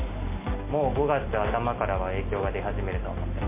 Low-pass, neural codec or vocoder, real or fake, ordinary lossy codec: 3.6 kHz; none; real; MP3, 32 kbps